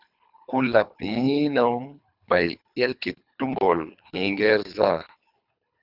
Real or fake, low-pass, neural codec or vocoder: fake; 5.4 kHz; codec, 24 kHz, 3 kbps, HILCodec